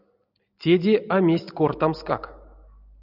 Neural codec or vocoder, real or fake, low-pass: none; real; 5.4 kHz